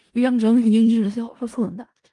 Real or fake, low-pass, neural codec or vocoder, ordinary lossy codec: fake; 10.8 kHz; codec, 16 kHz in and 24 kHz out, 0.4 kbps, LongCat-Audio-Codec, four codebook decoder; Opus, 32 kbps